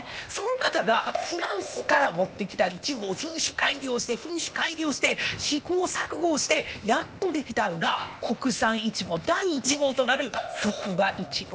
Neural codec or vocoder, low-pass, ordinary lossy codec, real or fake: codec, 16 kHz, 0.8 kbps, ZipCodec; none; none; fake